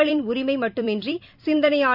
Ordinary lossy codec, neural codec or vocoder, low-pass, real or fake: none; vocoder, 44.1 kHz, 128 mel bands every 256 samples, BigVGAN v2; 5.4 kHz; fake